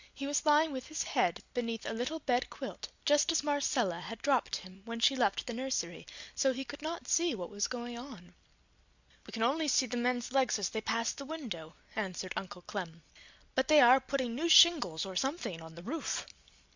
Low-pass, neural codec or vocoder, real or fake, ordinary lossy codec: 7.2 kHz; none; real; Opus, 64 kbps